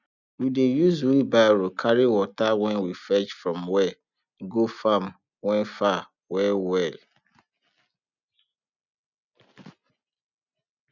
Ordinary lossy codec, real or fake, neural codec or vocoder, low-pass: none; real; none; 7.2 kHz